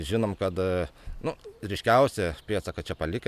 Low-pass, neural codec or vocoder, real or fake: 14.4 kHz; none; real